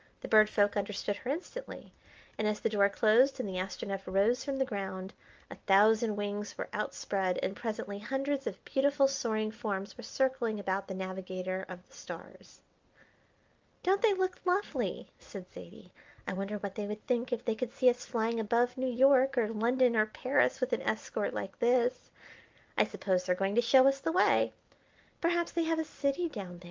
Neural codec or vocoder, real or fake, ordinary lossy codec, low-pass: none; real; Opus, 24 kbps; 7.2 kHz